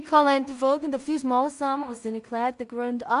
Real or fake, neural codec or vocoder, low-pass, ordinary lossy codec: fake; codec, 16 kHz in and 24 kHz out, 0.4 kbps, LongCat-Audio-Codec, two codebook decoder; 10.8 kHz; none